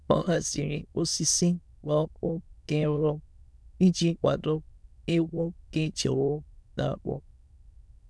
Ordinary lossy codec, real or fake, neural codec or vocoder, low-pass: none; fake; autoencoder, 22.05 kHz, a latent of 192 numbers a frame, VITS, trained on many speakers; none